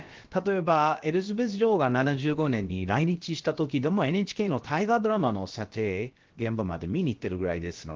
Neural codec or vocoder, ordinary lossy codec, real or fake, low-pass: codec, 16 kHz, about 1 kbps, DyCAST, with the encoder's durations; Opus, 16 kbps; fake; 7.2 kHz